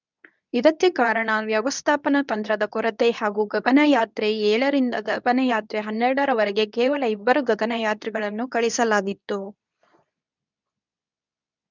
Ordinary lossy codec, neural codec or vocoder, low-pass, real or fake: none; codec, 24 kHz, 0.9 kbps, WavTokenizer, medium speech release version 2; 7.2 kHz; fake